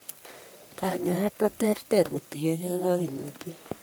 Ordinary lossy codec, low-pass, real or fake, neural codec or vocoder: none; none; fake; codec, 44.1 kHz, 1.7 kbps, Pupu-Codec